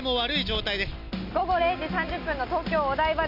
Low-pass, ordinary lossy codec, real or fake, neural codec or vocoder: 5.4 kHz; none; real; none